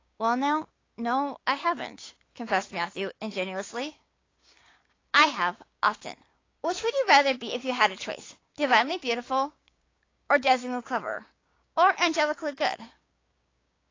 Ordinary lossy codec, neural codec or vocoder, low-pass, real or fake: AAC, 32 kbps; codec, 16 kHz, 6 kbps, DAC; 7.2 kHz; fake